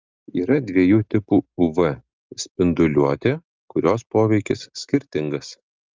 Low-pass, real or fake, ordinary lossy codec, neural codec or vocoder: 7.2 kHz; real; Opus, 24 kbps; none